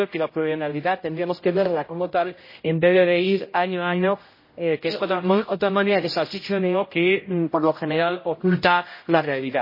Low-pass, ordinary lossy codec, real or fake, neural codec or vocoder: 5.4 kHz; MP3, 24 kbps; fake; codec, 16 kHz, 0.5 kbps, X-Codec, HuBERT features, trained on general audio